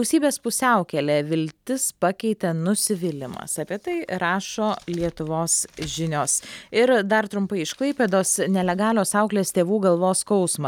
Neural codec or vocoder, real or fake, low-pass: none; real; 19.8 kHz